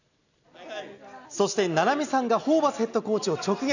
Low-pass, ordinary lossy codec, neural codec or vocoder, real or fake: 7.2 kHz; none; none; real